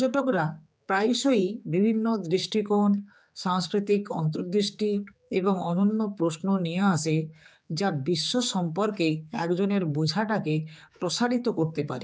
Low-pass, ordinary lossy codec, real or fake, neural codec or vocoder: none; none; fake; codec, 16 kHz, 4 kbps, X-Codec, HuBERT features, trained on general audio